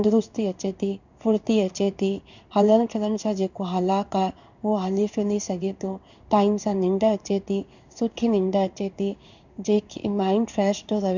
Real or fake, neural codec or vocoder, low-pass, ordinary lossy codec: fake; codec, 16 kHz in and 24 kHz out, 1 kbps, XY-Tokenizer; 7.2 kHz; none